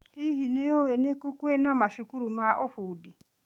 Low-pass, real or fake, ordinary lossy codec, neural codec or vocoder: 19.8 kHz; fake; none; codec, 44.1 kHz, 7.8 kbps, DAC